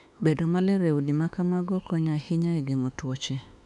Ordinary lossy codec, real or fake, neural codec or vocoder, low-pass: none; fake; autoencoder, 48 kHz, 32 numbers a frame, DAC-VAE, trained on Japanese speech; 10.8 kHz